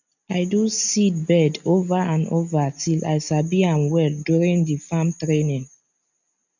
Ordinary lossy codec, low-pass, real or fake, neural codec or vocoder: none; 7.2 kHz; real; none